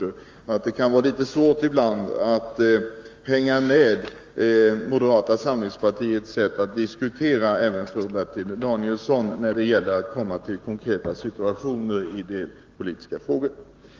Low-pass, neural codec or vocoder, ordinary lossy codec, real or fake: 7.2 kHz; codec, 16 kHz, 6 kbps, DAC; Opus, 32 kbps; fake